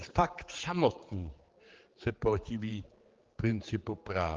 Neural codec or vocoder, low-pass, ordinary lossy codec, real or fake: codec, 16 kHz, 4 kbps, X-Codec, HuBERT features, trained on general audio; 7.2 kHz; Opus, 16 kbps; fake